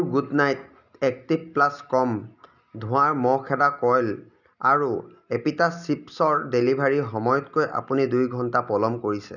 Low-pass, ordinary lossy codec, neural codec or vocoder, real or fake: 7.2 kHz; none; none; real